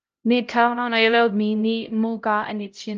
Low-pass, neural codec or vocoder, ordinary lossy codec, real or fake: 7.2 kHz; codec, 16 kHz, 0.5 kbps, X-Codec, HuBERT features, trained on LibriSpeech; Opus, 24 kbps; fake